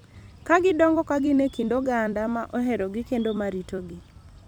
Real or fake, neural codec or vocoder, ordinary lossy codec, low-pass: fake; vocoder, 44.1 kHz, 128 mel bands every 256 samples, BigVGAN v2; none; 19.8 kHz